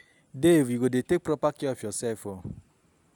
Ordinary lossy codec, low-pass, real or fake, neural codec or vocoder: none; 19.8 kHz; real; none